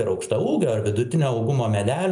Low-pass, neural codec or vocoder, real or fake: 10.8 kHz; none; real